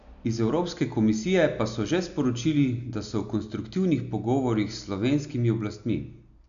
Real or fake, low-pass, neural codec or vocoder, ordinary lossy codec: real; 7.2 kHz; none; none